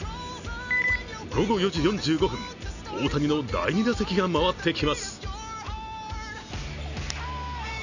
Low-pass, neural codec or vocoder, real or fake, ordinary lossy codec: 7.2 kHz; none; real; none